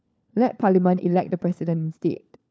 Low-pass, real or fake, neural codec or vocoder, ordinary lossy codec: none; fake; codec, 16 kHz, 4 kbps, FunCodec, trained on LibriTTS, 50 frames a second; none